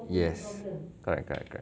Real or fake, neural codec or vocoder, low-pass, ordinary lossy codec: real; none; none; none